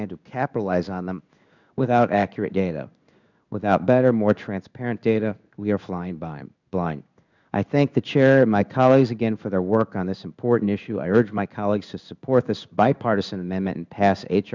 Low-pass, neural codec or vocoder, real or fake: 7.2 kHz; codec, 16 kHz in and 24 kHz out, 1 kbps, XY-Tokenizer; fake